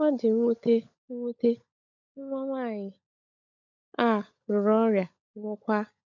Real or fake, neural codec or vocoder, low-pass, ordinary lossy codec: fake; codec, 16 kHz, 16 kbps, FunCodec, trained on LibriTTS, 50 frames a second; 7.2 kHz; none